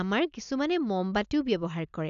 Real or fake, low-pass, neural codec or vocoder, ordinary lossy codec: real; 7.2 kHz; none; none